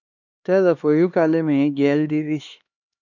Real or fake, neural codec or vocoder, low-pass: fake; codec, 16 kHz, 2 kbps, X-Codec, HuBERT features, trained on LibriSpeech; 7.2 kHz